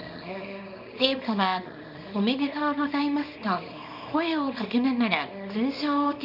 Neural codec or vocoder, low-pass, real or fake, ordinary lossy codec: codec, 24 kHz, 0.9 kbps, WavTokenizer, small release; 5.4 kHz; fake; none